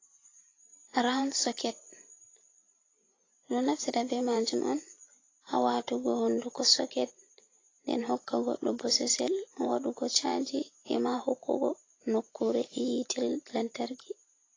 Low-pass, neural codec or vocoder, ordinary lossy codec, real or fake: 7.2 kHz; vocoder, 44.1 kHz, 128 mel bands every 512 samples, BigVGAN v2; AAC, 32 kbps; fake